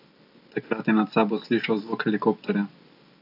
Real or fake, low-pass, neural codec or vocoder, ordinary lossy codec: real; 5.4 kHz; none; none